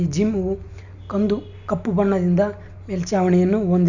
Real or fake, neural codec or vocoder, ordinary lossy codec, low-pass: real; none; none; 7.2 kHz